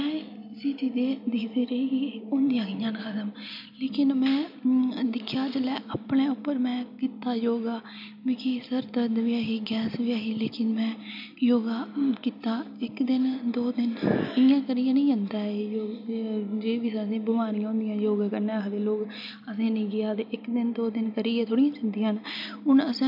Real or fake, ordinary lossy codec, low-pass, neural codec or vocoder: real; AAC, 48 kbps; 5.4 kHz; none